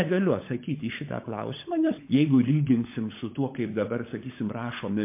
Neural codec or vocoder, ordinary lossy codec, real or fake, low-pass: codec, 16 kHz, 16 kbps, FunCodec, trained on LibriTTS, 50 frames a second; MP3, 24 kbps; fake; 3.6 kHz